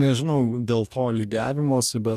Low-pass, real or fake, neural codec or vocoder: 14.4 kHz; fake; codec, 44.1 kHz, 2.6 kbps, DAC